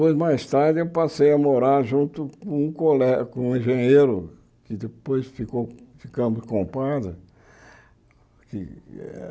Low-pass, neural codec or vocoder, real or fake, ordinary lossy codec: none; none; real; none